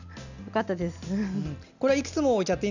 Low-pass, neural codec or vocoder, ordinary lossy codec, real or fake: 7.2 kHz; none; none; real